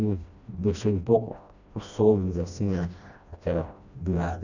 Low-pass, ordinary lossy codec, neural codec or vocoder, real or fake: 7.2 kHz; none; codec, 16 kHz, 1 kbps, FreqCodec, smaller model; fake